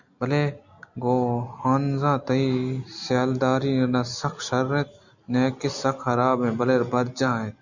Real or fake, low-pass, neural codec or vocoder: real; 7.2 kHz; none